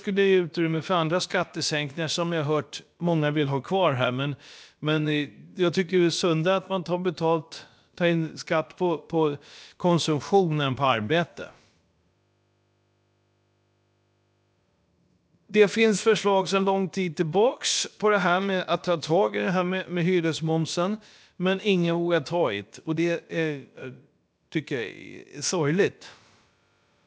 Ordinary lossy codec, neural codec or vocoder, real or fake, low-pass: none; codec, 16 kHz, about 1 kbps, DyCAST, with the encoder's durations; fake; none